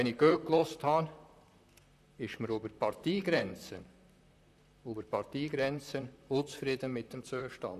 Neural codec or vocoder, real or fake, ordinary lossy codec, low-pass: vocoder, 44.1 kHz, 128 mel bands, Pupu-Vocoder; fake; none; 14.4 kHz